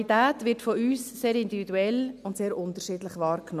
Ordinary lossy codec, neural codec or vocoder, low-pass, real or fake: none; none; 14.4 kHz; real